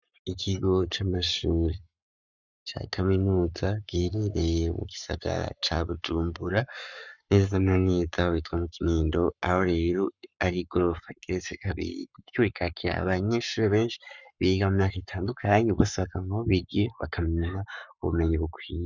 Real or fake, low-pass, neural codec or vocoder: fake; 7.2 kHz; codec, 44.1 kHz, 7.8 kbps, Pupu-Codec